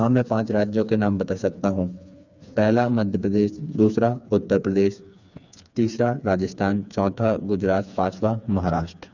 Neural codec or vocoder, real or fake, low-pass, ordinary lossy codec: codec, 16 kHz, 4 kbps, FreqCodec, smaller model; fake; 7.2 kHz; none